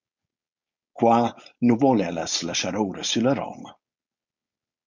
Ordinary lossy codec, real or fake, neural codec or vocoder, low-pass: Opus, 64 kbps; fake; codec, 16 kHz, 4.8 kbps, FACodec; 7.2 kHz